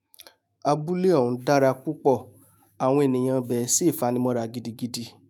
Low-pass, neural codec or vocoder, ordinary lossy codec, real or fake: none; autoencoder, 48 kHz, 128 numbers a frame, DAC-VAE, trained on Japanese speech; none; fake